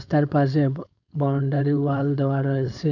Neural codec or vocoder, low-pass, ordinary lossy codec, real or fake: codec, 16 kHz, 4.8 kbps, FACodec; 7.2 kHz; none; fake